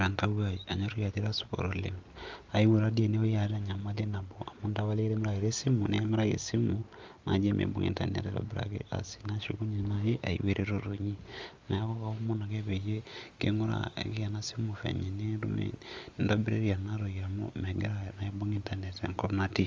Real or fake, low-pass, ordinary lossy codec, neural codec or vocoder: real; 7.2 kHz; Opus, 32 kbps; none